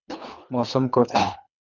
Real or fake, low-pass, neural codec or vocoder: fake; 7.2 kHz; codec, 24 kHz, 3 kbps, HILCodec